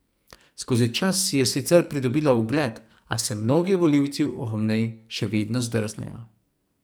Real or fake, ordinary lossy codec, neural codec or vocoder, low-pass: fake; none; codec, 44.1 kHz, 2.6 kbps, SNAC; none